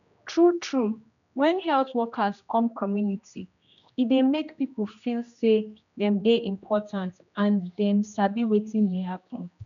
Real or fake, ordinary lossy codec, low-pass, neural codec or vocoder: fake; none; 7.2 kHz; codec, 16 kHz, 1 kbps, X-Codec, HuBERT features, trained on general audio